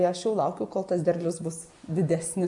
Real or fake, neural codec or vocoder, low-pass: real; none; 10.8 kHz